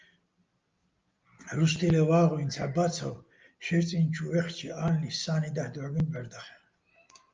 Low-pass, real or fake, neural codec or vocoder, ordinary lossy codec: 7.2 kHz; real; none; Opus, 24 kbps